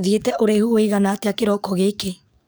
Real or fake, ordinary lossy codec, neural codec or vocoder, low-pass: fake; none; codec, 44.1 kHz, 7.8 kbps, DAC; none